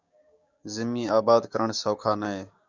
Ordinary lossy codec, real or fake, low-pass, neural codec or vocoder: Opus, 64 kbps; fake; 7.2 kHz; codec, 16 kHz, 6 kbps, DAC